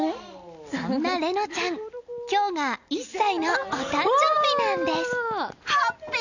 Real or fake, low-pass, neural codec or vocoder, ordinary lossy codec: real; 7.2 kHz; none; none